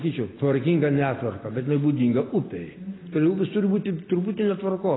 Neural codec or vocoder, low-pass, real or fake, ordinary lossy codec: none; 7.2 kHz; real; AAC, 16 kbps